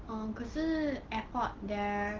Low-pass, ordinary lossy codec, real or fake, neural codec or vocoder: 7.2 kHz; Opus, 16 kbps; real; none